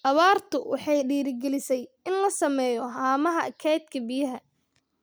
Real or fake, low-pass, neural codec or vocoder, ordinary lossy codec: real; none; none; none